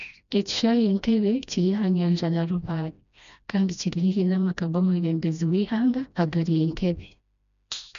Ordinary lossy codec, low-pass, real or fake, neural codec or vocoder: none; 7.2 kHz; fake; codec, 16 kHz, 1 kbps, FreqCodec, smaller model